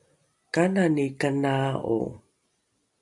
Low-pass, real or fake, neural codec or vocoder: 10.8 kHz; real; none